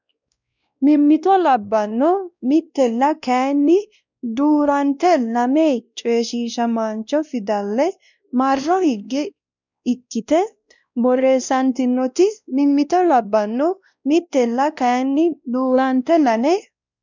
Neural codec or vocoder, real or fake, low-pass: codec, 16 kHz, 1 kbps, X-Codec, WavLM features, trained on Multilingual LibriSpeech; fake; 7.2 kHz